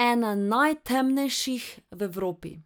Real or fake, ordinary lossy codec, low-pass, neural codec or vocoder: real; none; none; none